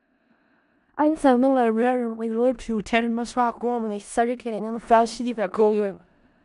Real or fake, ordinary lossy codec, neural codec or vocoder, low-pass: fake; none; codec, 16 kHz in and 24 kHz out, 0.4 kbps, LongCat-Audio-Codec, four codebook decoder; 10.8 kHz